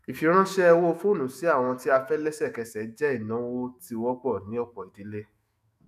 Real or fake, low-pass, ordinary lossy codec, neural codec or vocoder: fake; 14.4 kHz; none; autoencoder, 48 kHz, 128 numbers a frame, DAC-VAE, trained on Japanese speech